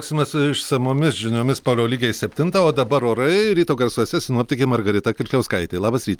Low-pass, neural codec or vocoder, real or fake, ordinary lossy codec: 19.8 kHz; none; real; Opus, 32 kbps